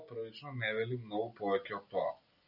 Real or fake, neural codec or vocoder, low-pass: real; none; 5.4 kHz